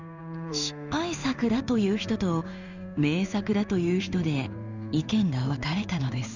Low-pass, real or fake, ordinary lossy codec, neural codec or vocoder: 7.2 kHz; fake; none; codec, 16 kHz in and 24 kHz out, 1 kbps, XY-Tokenizer